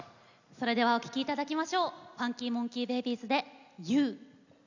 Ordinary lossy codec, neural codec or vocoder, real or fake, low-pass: none; none; real; 7.2 kHz